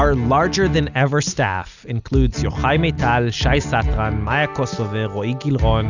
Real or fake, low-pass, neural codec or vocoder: real; 7.2 kHz; none